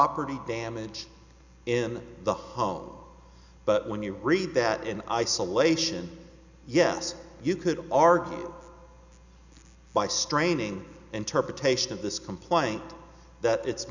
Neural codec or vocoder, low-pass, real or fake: none; 7.2 kHz; real